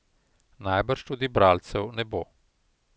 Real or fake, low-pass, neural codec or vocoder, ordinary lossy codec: real; none; none; none